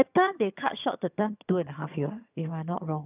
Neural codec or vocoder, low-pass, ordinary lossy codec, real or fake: vocoder, 22.05 kHz, 80 mel bands, HiFi-GAN; 3.6 kHz; none; fake